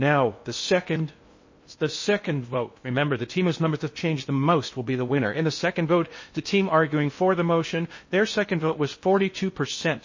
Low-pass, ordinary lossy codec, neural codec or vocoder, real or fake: 7.2 kHz; MP3, 32 kbps; codec, 16 kHz in and 24 kHz out, 0.6 kbps, FocalCodec, streaming, 2048 codes; fake